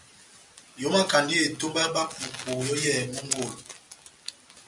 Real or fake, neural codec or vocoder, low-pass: real; none; 10.8 kHz